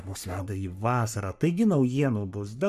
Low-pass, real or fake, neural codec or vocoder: 14.4 kHz; fake; codec, 44.1 kHz, 3.4 kbps, Pupu-Codec